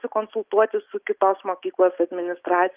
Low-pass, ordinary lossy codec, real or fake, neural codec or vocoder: 3.6 kHz; Opus, 32 kbps; real; none